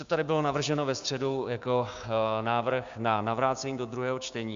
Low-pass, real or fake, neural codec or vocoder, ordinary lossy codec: 7.2 kHz; fake; codec, 16 kHz, 6 kbps, DAC; Opus, 64 kbps